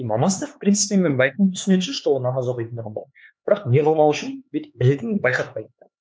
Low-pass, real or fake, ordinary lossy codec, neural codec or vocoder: none; fake; none; codec, 16 kHz, 4 kbps, X-Codec, HuBERT features, trained on LibriSpeech